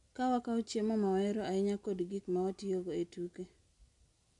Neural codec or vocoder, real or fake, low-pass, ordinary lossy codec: none; real; 10.8 kHz; none